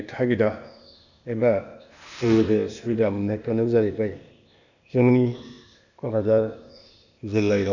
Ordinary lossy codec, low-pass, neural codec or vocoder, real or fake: none; 7.2 kHz; codec, 16 kHz, 0.8 kbps, ZipCodec; fake